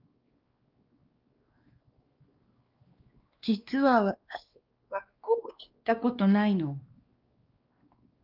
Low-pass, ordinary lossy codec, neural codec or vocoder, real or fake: 5.4 kHz; Opus, 32 kbps; codec, 16 kHz, 2 kbps, X-Codec, WavLM features, trained on Multilingual LibriSpeech; fake